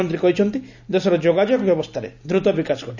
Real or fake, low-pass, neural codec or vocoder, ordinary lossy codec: real; 7.2 kHz; none; none